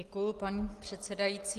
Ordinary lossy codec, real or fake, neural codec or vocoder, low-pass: Opus, 24 kbps; real; none; 14.4 kHz